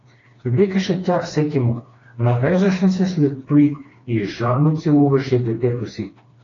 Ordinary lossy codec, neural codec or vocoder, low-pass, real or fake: AAC, 32 kbps; codec, 16 kHz, 2 kbps, FreqCodec, smaller model; 7.2 kHz; fake